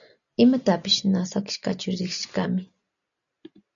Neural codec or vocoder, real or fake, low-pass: none; real; 7.2 kHz